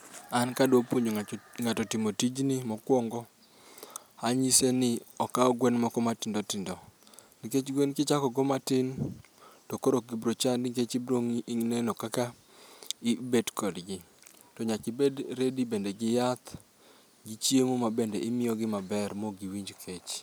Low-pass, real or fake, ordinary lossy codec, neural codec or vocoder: none; real; none; none